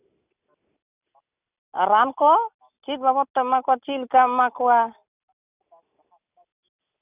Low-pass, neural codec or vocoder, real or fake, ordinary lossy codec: 3.6 kHz; none; real; none